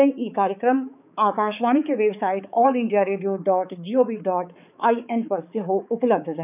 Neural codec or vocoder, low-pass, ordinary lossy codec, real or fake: codec, 16 kHz, 4 kbps, X-Codec, HuBERT features, trained on balanced general audio; 3.6 kHz; none; fake